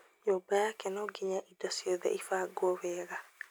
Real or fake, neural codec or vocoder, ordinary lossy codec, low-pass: real; none; none; none